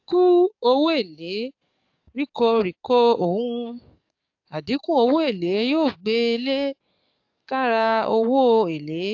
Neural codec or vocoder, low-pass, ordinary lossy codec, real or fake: codec, 44.1 kHz, 7.8 kbps, DAC; 7.2 kHz; AAC, 48 kbps; fake